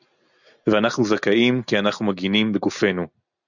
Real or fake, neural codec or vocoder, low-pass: real; none; 7.2 kHz